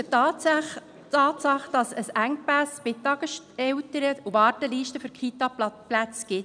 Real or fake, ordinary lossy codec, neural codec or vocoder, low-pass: real; none; none; 9.9 kHz